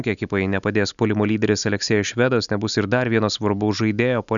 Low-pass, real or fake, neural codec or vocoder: 7.2 kHz; real; none